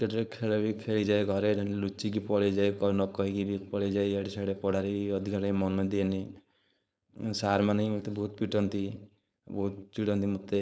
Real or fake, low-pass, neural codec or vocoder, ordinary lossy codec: fake; none; codec, 16 kHz, 4.8 kbps, FACodec; none